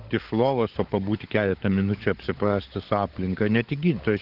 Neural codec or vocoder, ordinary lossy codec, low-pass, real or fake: codec, 16 kHz, 16 kbps, FunCodec, trained on LibriTTS, 50 frames a second; Opus, 16 kbps; 5.4 kHz; fake